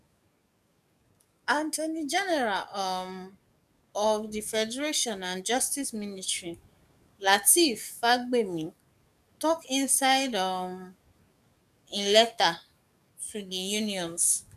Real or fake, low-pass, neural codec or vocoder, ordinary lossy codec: fake; 14.4 kHz; codec, 44.1 kHz, 7.8 kbps, DAC; none